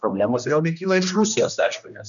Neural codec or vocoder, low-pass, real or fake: codec, 16 kHz, 1 kbps, X-Codec, HuBERT features, trained on general audio; 7.2 kHz; fake